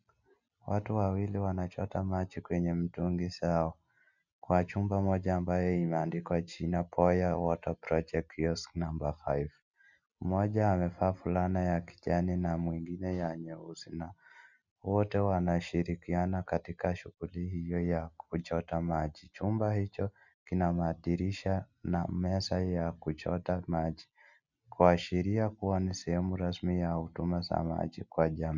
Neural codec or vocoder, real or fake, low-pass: none; real; 7.2 kHz